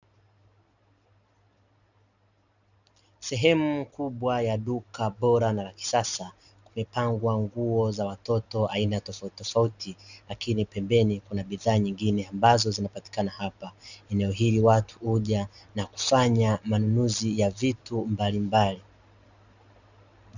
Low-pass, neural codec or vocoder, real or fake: 7.2 kHz; none; real